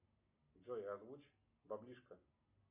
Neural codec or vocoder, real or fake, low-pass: none; real; 3.6 kHz